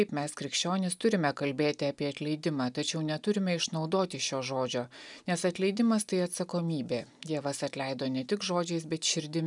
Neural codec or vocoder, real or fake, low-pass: none; real; 10.8 kHz